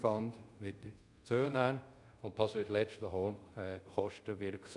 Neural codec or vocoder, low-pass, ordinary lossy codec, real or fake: codec, 24 kHz, 0.5 kbps, DualCodec; 10.8 kHz; none; fake